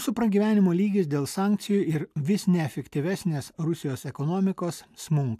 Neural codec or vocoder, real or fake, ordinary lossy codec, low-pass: none; real; AAC, 96 kbps; 14.4 kHz